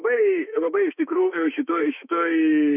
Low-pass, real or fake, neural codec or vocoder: 3.6 kHz; fake; autoencoder, 48 kHz, 32 numbers a frame, DAC-VAE, trained on Japanese speech